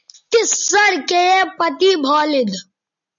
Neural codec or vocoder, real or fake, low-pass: none; real; 7.2 kHz